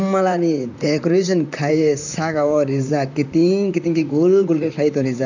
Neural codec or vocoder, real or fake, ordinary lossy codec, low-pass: vocoder, 44.1 kHz, 128 mel bands, Pupu-Vocoder; fake; none; 7.2 kHz